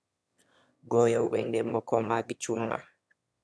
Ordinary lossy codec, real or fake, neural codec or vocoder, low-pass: none; fake; autoencoder, 22.05 kHz, a latent of 192 numbers a frame, VITS, trained on one speaker; none